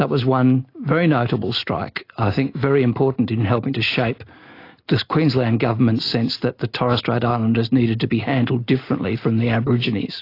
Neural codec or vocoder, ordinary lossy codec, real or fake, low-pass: none; AAC, 32 kbps; real; 5.4 kHz